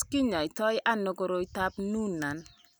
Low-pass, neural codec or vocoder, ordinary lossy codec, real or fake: none; none; none; real